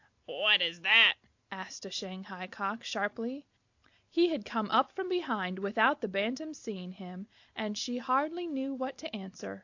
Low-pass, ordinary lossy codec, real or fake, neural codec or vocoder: 7.2 kHz; AAC, 48 kbps; real; none